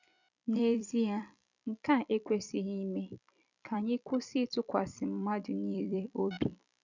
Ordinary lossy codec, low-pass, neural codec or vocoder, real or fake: none; 7.2 kHz; vocoder, 44.1 kHz, 128 mel bands every 256 samples, BigVGAN v2; fake